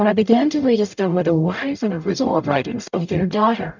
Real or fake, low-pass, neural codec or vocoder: fake; 7.2 kHz; codec, 44.1 kHz, 0.9 kbps, DAC